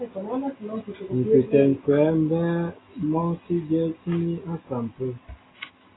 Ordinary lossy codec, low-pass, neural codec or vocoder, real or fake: AAC, 16 kbps; 7.2 kHz; none; real